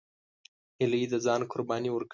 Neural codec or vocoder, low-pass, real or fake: none; 7.2 kHz; real